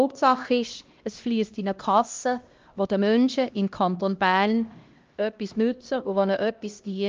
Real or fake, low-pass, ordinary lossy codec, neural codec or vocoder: fake; 7.2 kHz; Opus, 24 kbps; codec, 16 kHz, 1 kbps, X-Codec, HuBERT features, trained on LibriSpeech